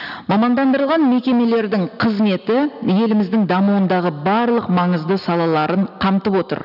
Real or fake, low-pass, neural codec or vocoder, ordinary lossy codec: real; 5.4 kHz; none; none